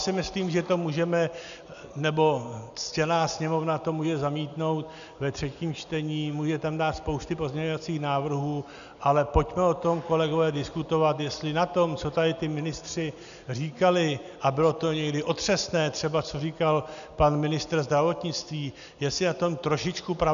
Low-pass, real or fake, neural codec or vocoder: 7.2 kHz; real; none